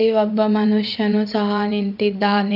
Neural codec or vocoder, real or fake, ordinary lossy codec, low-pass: vocoder, 22.05 kHz, 80 mel bands, WaveNeXt; fake; none; 5.4 kHz